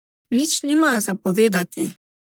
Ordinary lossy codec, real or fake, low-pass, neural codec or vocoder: none; fake; none; codec, 44.1 kHz, 1.7 kbps, Pupu-Codec